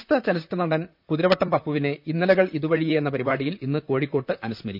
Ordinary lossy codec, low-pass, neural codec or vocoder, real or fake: none; 5.4 kHz; vocoder, 44.1 kHz, 128 mel bands, Pupu-Vocoder; fake